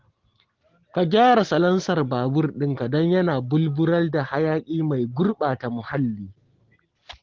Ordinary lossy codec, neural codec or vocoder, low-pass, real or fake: Opus, 16 kbps; none; 7.2 kHz; real